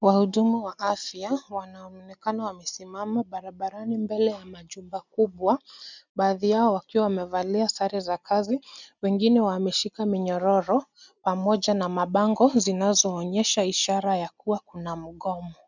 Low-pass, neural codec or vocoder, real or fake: 7.2 kHz; none; real